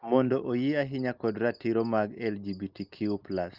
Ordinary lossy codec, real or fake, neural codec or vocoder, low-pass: Opus, 32 kbps; real; none; 5.4 kHz